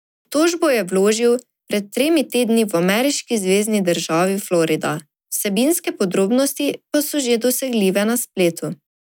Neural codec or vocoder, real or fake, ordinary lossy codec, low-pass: none; real; none; none